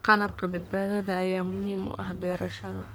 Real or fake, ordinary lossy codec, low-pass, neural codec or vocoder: fake; none; none; codec, 44.1 kHz, 1.7 kbps, Pupu-Codec